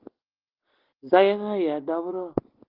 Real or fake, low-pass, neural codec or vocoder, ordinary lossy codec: real; 5.4 kHz; none; Opus, 16 kbps